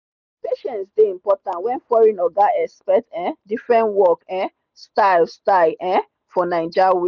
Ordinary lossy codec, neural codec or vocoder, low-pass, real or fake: none; none; 7.2 kHz; real